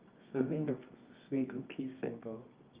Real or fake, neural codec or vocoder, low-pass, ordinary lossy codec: fake; codec, 24 kHz, 0.9 kbps, WavTokenizer, medium music audio release; 3.6 kHz; Opus, 32 kbps